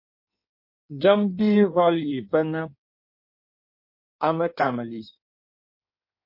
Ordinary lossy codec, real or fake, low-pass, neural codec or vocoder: MP3, 32 kbps; fake; 5.4 kHz; codec, 16 kHz in and 24 kHz out, 1.1 kbps, FireRedTTS-2 codec